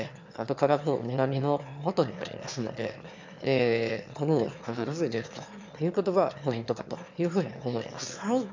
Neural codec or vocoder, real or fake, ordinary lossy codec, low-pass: autoencoder, 22.05 kHz, a latent of 192 numbers a frame, VITS, trained on one speaker; fake; MP3, 64 kbps; 7.2 kHz